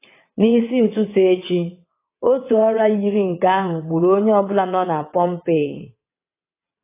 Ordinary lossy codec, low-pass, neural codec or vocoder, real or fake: AAC, 24 kbps; 3.6 kHz; vocoder, 22.05 kHz, 80 mel bands, WaveNeXt; fake